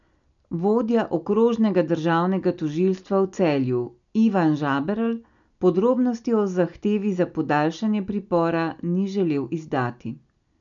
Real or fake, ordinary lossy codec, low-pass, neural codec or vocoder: real; none; 7.2 kHz; none